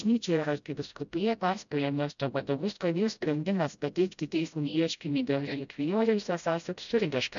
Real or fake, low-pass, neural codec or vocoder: fake; 7.2 kHz; codec, 16 kHz, 0.5 kbps, FreqCodec, smaller model